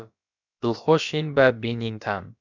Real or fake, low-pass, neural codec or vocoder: fake; 7.2 kHz; codec, 16 kHz, about 1 kbps, DyCAST, with the encoder's durations